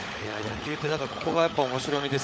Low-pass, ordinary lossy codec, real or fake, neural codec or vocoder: none; none; fake; codec, 16 kHz, 16 kbps, FunCodec, trained on LibriTTS, 50 frames a second